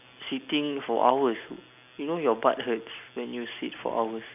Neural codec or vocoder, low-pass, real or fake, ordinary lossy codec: none; 3.6 kHz; real; none